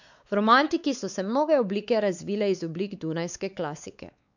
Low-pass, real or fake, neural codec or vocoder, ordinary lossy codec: 7.2 kHz; fake; codec, 16 kHz, 4 kbps, X-Codec, WavLM features, trained on Multilingual LibriSpeech; none